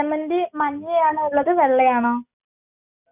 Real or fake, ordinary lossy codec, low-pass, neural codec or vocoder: real; none; 3.6 kHz; none